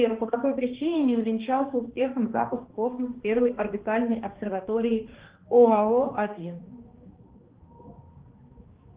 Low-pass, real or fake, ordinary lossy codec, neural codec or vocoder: 3.6 kHz; fake; Opus, 16 kbps; codec, 16 kHz, 2 kbps, X-Codec, HuBERT features, trained on balanced general audio